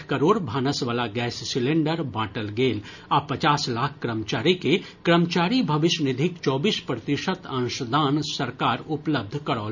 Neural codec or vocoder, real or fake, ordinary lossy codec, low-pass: none; real; none; 7.2 kHz